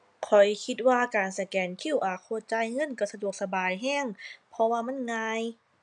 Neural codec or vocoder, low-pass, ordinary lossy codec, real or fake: none; 9.9 kHz; none; real